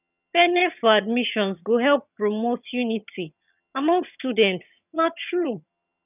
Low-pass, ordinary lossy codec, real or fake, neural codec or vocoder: 3.6 kHz; none; fake; vocoder, 22.05 kHz, 80 mel bands, HiFi-GAN